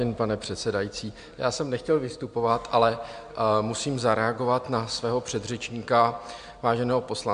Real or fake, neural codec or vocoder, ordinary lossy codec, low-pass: real; none; MP3, 64 kbps; 9.9 kHz